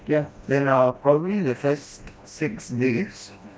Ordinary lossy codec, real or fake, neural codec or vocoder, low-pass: none; fake; codec, 16 kHz, 1 kbps, FreqCodec, smaller model; none